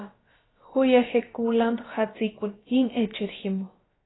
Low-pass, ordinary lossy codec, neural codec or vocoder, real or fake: 7.2 kHz; AAC, 16 kbps; codec, 16 kHz, about 1 kbps, DyCAST, with the encoder's durations; fake